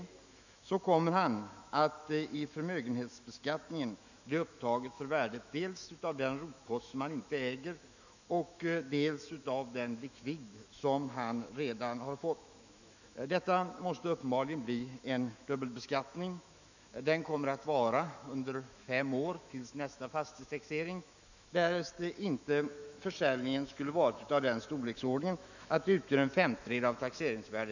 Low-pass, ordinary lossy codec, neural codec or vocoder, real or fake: 7.2 kHz; none; autoencoder, 48 kHz, 128 numbers a frame, DAC-VAE, trained on Japanese speech; fake